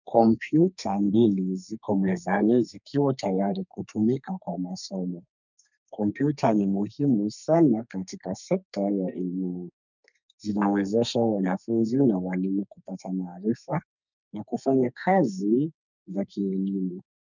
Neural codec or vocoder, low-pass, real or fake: codec, 32 kHz, 1.9 kbps, SNAC; 7.2 kHz; fake